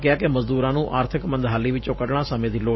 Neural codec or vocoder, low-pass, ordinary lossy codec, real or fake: vocoder, 44.1 kHz, 128 mel bands every 512 samples, BigVGAN v2; 7.2 kHz; MP3, 24 kbps; fake